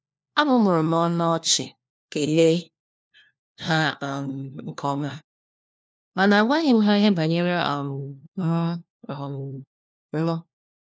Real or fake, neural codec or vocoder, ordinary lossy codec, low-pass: fake; codec, 16 kHz, 1 kbps, FunCodec, trained on LibriTTS, 50 frames a second; none; none